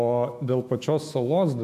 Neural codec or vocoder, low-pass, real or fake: autoencoder, 48 kHz, 128 numbers a frame, DAC-VAE, trained on Japanese speech; 14.4 kHz; fake